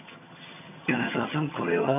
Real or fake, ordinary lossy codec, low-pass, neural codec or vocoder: fake; none; 3.6 kHz; vocoder, 22.05 kHz, 80 mel bands, HiFi-GAN